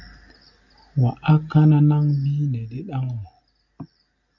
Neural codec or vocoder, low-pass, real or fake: none; 7.2 kHz; real